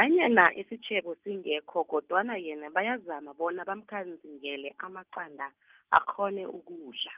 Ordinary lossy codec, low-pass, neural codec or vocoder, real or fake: Opus, 32 kbps; 3.6 kHz; none; real